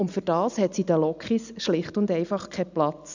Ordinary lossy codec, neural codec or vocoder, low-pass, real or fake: none; none; 7.2 kHz; real